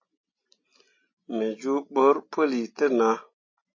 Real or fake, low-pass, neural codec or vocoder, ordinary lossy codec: real; 7.2 kHz; none; MP3, 32 kbps